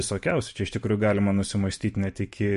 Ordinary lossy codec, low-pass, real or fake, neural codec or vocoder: MP3, 48 kbps; 14.4 kHz; fake; vocoder, 44.1 kHz, 128 mel bands every 512 samples, BigVGAN v2